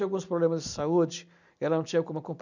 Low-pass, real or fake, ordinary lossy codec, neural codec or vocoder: 7.2 kHz; real; none; none